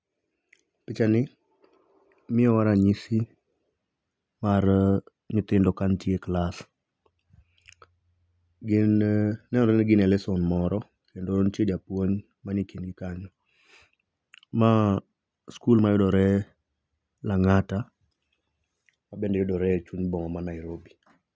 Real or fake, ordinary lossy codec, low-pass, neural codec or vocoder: real; none; none; none